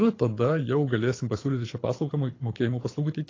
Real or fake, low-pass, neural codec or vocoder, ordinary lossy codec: fake; 7.2 kHz; codec, 24 kHz, 6 kbps, HILCodec; AAC, 32 kbps